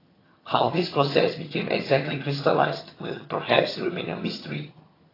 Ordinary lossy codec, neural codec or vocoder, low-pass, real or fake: AAC, 24 kbps; vocoder, 22.05 kHz, 80 mel bands, HiFi-GAN; 5.4 kHz; fake